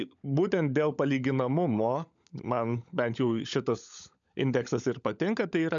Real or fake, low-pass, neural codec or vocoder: fake; 7.2 kHz; codec, 16 kHz, 16 kbps, FunCodec, trained on LibriTTS, 50 frames a second